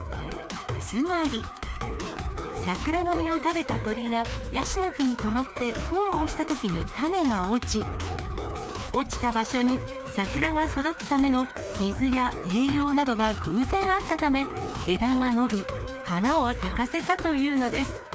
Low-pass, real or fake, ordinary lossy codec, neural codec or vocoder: none; fake; none; codec, 16 kHz, 2 kbps, FreqCodec, larger model